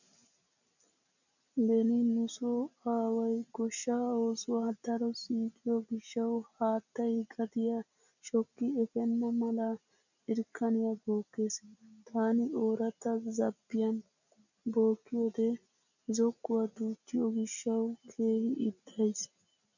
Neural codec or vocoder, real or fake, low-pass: none; real; 7.2 kHz